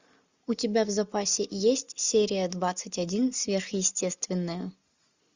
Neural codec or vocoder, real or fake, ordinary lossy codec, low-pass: none; real; Opus, 64 kbps; 7.2 kHz